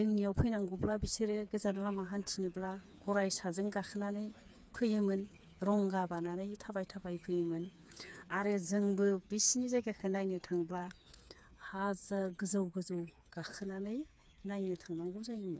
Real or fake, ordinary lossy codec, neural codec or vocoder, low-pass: fake; none; codec, 16 kHz, 4 kbps, FreqCodec, smaller model; none